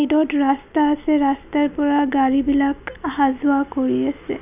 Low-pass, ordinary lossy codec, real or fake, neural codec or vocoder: 3.6 kHz; none; real; none